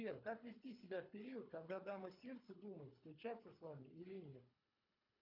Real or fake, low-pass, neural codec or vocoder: fake; 5.4 kHz; codec, 24 kHz, 3 kbps, HILCodec